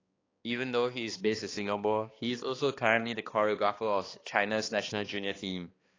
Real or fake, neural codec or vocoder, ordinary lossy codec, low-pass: fake; codec, 16 kHz, 2 kbps, X-Codec, HuBERT features, trained on balanced general audio; AAC, 32 kbps; 7.2 kHz